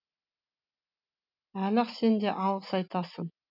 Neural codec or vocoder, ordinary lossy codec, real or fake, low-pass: none; none; real; 5.4 kHz